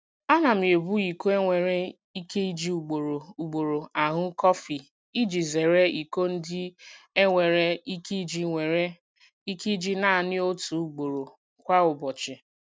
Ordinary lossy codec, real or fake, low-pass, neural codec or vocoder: none; real; none; none